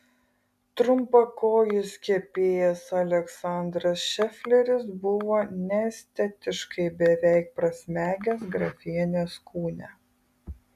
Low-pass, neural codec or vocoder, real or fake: 14.4 kHz; none; real